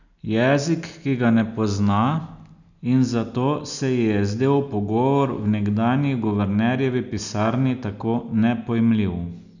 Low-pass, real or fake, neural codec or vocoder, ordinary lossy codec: 7.2 kHz; real; none; none